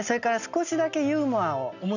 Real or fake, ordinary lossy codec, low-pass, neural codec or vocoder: real; none; 7.2 kHz; none